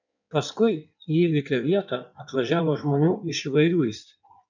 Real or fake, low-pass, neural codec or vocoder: fake; 7.2 kHz; codec, 16 kHz in and 24 kHz out, 1.1 kbps, FireRedTTS-2 codec